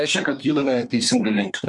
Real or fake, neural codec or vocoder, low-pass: fake; codec, 24 kHz, 1 kbps, SNAC; 10.8 kHz